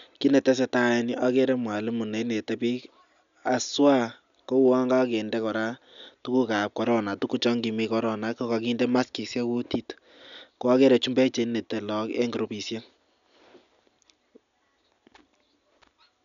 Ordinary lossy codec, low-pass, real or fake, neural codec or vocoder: none; 7.2 kHz; real; none